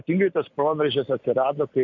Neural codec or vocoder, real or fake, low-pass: none; real; 7.2 kHz